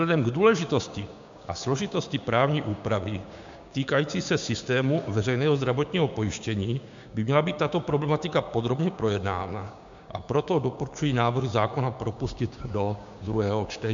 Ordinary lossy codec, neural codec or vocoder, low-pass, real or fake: MP3, 64 kbps; codec, 16 kHz, 6 kbps, DAC; 7.2 kHz; fake